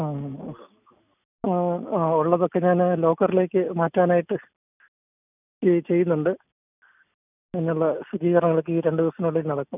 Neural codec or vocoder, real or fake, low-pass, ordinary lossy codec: none; real; 3.6 kHz; none